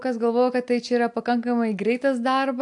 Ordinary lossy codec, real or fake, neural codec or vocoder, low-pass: AAC, 64 kbps; real; none; 10.8 kHz